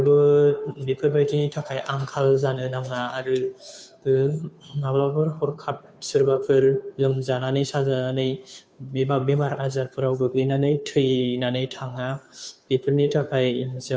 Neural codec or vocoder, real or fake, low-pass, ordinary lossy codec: codec, 16 kHz, 2 kbps, FunCodec, trained on Chinese and English, 25 frames a second; fake; none; none